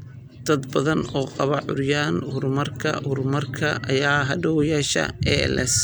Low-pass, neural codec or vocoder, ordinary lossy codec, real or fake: none; none; none; real